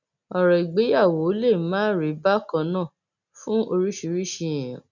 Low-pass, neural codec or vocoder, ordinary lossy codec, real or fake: 7.2 kHz; none; none; real